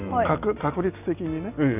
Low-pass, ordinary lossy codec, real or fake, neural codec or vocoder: 3.6 kHz; none; real; none